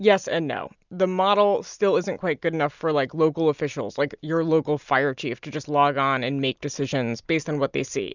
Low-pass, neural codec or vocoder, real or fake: 7.2 kHz; none; real